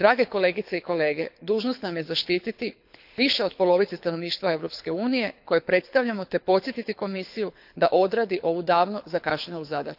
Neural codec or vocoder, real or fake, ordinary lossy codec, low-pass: codec, 24 kHz, 6 kbps, HILCodec; fake; AAC, 48 kbps; 5.4 kHz